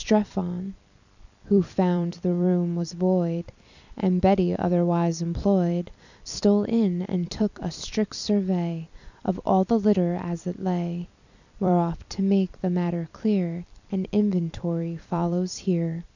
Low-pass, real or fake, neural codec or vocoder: 7.2 kHz; fake; vocoder, 44.1 kHz, 128 mel bands every 256 samples, BigVGAN v2